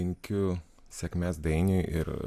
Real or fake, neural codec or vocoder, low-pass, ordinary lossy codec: real; none; 14.4 kHz; Opus, 64 kbps